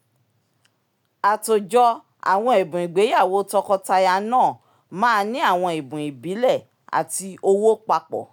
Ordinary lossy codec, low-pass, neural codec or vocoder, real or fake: none; 19.8 kHz; none; real